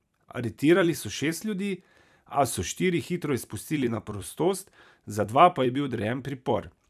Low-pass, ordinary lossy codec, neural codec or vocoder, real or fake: 14.4 kHz; none; vocoder, 44.1 kHz, 128 mel bands every 256 samples, BigVGAN v2; fake